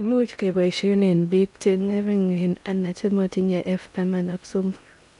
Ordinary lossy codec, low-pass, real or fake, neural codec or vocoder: none; 10.8 kHz; fake; codec, 16 kHz in and 24 kHz out, 0.6 kbps, FocalCodec, streaming, 2048 codes